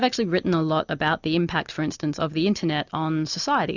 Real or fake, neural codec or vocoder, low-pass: real; none; 7.2 kHz